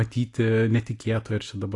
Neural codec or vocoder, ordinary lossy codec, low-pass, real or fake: none; AAC, 48 kbps; 10.8 kHz; real